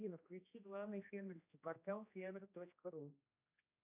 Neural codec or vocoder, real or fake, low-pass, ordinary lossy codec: codec, 16 kHz, 1 kbps, X-Codec, HuBERT features, trained on general audio; fake; 3.6 kHz; AAC, 24 kbps